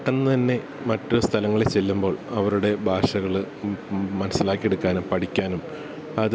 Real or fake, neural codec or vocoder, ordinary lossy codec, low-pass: real; none; none; none